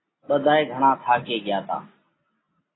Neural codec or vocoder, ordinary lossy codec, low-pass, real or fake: none; AAC, 16 kbps; 7.2 kHz; real